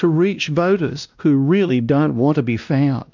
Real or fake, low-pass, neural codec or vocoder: fake; 7.2 kHz; codec, 16 kHz, 1 kbps, X-Codec, WavLM features, trained on Multilingual LibriSpeech